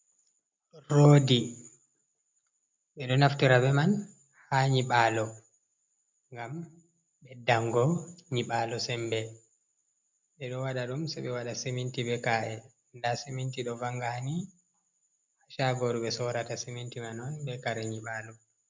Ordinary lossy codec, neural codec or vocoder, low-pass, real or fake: MP3, 64 kbps; none; 7.2 kHz; real